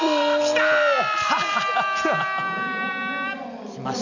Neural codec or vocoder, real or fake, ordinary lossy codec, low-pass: none; real; none; 7.2 kHz